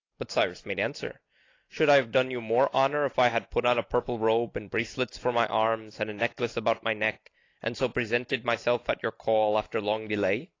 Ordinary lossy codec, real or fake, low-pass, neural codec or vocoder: AAC, 32 kbps; real; 7.2 kHz; none